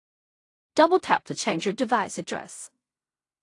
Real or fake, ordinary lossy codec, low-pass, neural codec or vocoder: fake; AAC, 48 kbps; 10.8 kHz; codec, 16 kHz in and 24 kHz out, 0.4 kbps, LongCat-Audio-Codec, fine tuned four codebook decoder